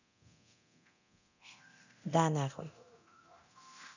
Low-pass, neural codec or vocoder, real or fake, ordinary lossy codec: 7.2 kHz; codec, 24 kHz, 0.9 kbps, DualCodec; fake; none